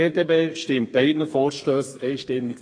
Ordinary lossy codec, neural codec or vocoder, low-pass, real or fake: AAC, 32 kbps; codec, 32 kHz, 1.9 kbps, SNAC; 9.9 kHz; fake